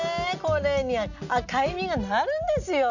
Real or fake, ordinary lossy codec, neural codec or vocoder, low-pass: real; none; none; 7.2 kHz